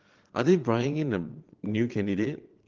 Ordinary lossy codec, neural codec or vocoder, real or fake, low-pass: Opus, 16 kbps; vocoder, 22.05 kHz, 80 mel bands, Vocos; fake; 7.2 kHz